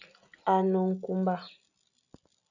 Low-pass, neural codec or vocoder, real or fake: 7.2 kHz; none; real